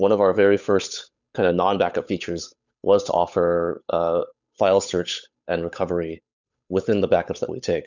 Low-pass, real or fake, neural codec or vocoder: 7.2 kHz; fake; codec, 16 kHz, 8 kbps, FunCodec, trained on Chinese and English, 25 frames a second